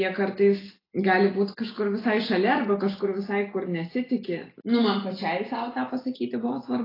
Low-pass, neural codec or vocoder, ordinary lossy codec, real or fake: 5.4 kHz; none; AAC, 24 kbps; real